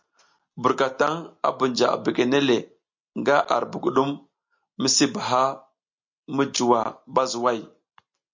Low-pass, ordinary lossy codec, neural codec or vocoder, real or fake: 7.2 kHz; MP3, 48 kbps; none; real